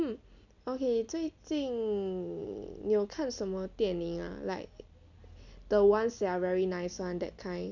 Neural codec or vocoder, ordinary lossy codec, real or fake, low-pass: none; none; real; 7.2 kHz